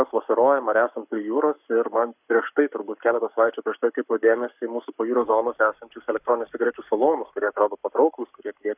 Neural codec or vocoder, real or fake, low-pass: codec, 44.1 kHz, 7.8 kbps, Pupu-Codec; fake; 3.6 kHz